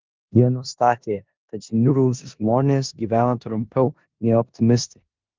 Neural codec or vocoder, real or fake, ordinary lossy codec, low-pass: codec, 16 kHz in and 24 kHz out, 0.9 kbps, LongCat-Audio-Codec, four codebook decoder; fake; Opus, 24 kbps; 7.2 kHz